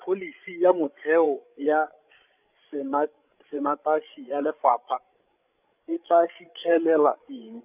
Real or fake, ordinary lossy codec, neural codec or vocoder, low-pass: fake; none; codec, 16 kHz, 16 kbps, FreqCodec, larger model; 3.6 kHz